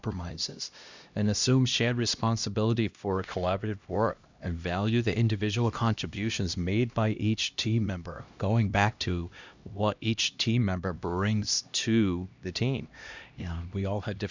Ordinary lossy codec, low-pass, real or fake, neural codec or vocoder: Opus, 64 kbps; 7.2 kHz; fake; codec, 16 kHz, 1 kbps, X-Codec, HuBERT features, trained on LibriSpeech